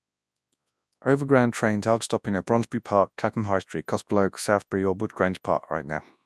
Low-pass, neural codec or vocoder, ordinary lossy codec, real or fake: none; codec, 24 kHz, 0.9 kbps, WavTokenizer, large speech release; none; fake